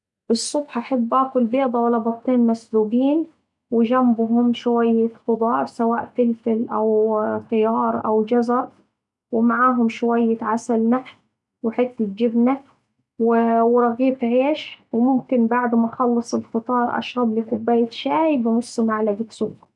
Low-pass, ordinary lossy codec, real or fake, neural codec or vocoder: 10.8 kHz; none; real; none